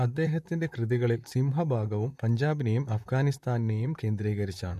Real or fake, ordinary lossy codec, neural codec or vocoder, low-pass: fake; MP3, 64 kbps; vocoder, 44.1 kHz, 128 mel bands, Pupu-Vocoder; 14.4 kHz